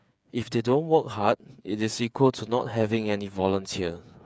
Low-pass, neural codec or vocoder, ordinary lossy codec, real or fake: none; codec, 16 kHz, 8 kbps, FreqCodec, smaller model; none; fake